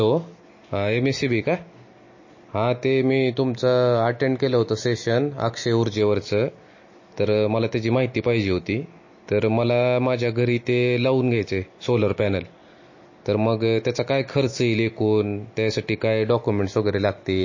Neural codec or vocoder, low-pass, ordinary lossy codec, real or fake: none; 7.2 kHz; MP3, 32 kbps; real